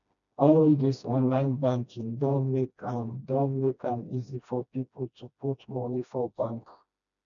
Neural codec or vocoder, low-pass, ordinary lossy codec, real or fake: codec, 16 kHz, 1 kbps, FreqCodec, smaller model; 7.2 kHz; none; fake